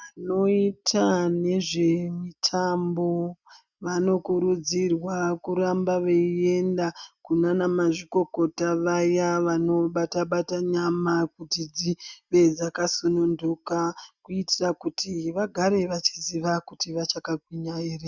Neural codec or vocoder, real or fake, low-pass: none; real; 7.2 kHz